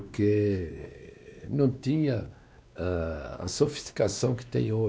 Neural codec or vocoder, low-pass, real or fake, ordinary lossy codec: codec, 16 kHz, 2 kbps, X-Codec, WavLM features, trained on Multilingual LibriSpeech; none; fake; none